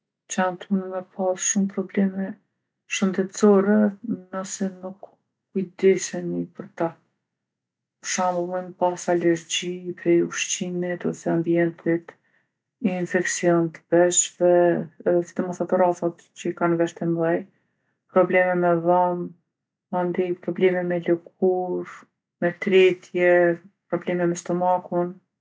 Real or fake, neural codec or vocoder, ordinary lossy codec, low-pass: real; none; none; none